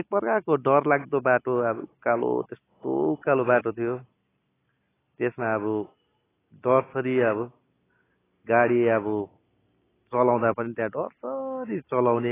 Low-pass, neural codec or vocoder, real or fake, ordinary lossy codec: 3.6 kHz; none; real; AAC, 16 kbps